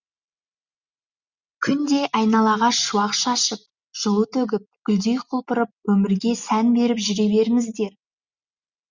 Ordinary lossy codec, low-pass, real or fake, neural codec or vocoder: Opus, 64 kbps; 7.2 kHz; real; none